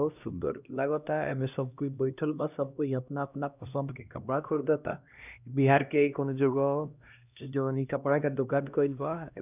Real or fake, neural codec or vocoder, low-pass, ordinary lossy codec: fake; codec, 16 kHz, 1 kbps, X-Codec, HuBERT features, trained on LibriSpeech; 3.6 kHz; none